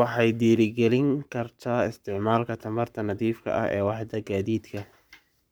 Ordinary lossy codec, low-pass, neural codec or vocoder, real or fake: none; none; codec, 44.1 kHz, 7.8 kbps, Pupu-Codec; fake